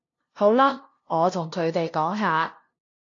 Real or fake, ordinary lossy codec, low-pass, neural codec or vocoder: fake; AAC, 48 kbps; 7.2 kHz; codec, 16 kHz, 0.5 kbps, FunCodec, trained on LibriTTS, 25 frames a second